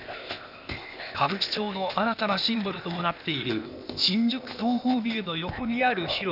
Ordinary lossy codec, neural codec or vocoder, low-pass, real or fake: none; codec, 16 kHz, 0.8 kbps, ZipCodec; 5.4 kHz; fake